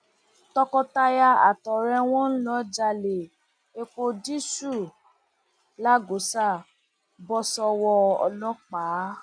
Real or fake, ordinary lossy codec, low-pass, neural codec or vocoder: real; none; 9.9 kHz; none